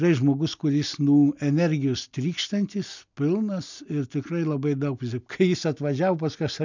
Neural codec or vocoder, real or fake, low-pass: none; real; 7.2 kHz